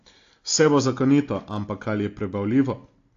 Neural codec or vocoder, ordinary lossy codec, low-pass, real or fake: none; AAC, 48 kbps; 7.2 kHz; real